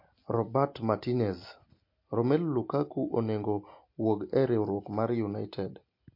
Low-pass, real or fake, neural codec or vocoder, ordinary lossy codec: 5.4 kHz; real; none; MP3, 32 kbps